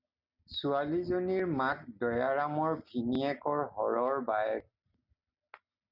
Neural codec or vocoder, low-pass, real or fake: none; 5.4 kHz; real